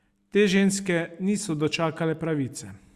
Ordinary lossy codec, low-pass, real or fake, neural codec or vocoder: Opus, 64 kbps; 14.4 kHz; real; none